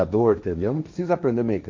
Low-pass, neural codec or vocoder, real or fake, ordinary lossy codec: 7.2 kHz; codec, 16 kHz, 1.1 kbps, Voila-Tokenizer; fake; MP3, 48 kbps